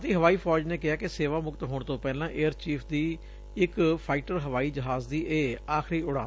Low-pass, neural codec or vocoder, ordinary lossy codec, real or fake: none; none; none; real